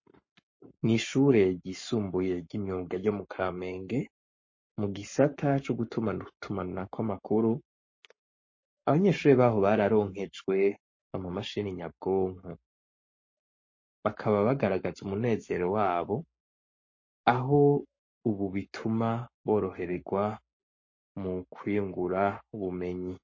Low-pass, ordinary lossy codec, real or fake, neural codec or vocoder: 7.2 kHz; MP3, 32 kbps; fake; codec, 44.1 kHz, 7.8 kbps, Pupu-Codec